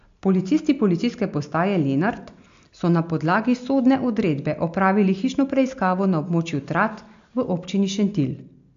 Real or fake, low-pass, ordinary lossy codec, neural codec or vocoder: real; 7.2 kHz; AAC, 64 kbps; none